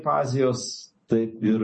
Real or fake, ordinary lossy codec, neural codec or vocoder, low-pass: real; MP3, 32 kbps; none; 10.8 kHz